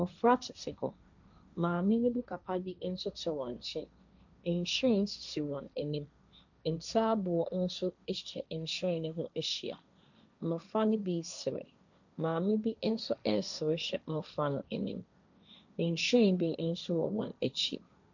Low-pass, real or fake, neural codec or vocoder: 7.2 kHz; fake; codec, 16 kHz, 1.1 kbps, Voila-Tokenizer